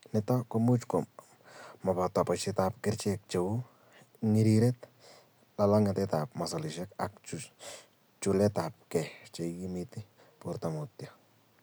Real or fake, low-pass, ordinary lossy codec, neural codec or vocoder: real; none; none; none